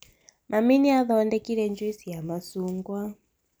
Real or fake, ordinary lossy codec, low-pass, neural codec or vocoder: real; none; none; none